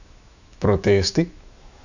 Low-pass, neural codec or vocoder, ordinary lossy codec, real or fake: 7.2 kHz; autoencoder, 48 kHz, 128 numbers a frame, DAC-VAE, trained on Japanese speech; none; fake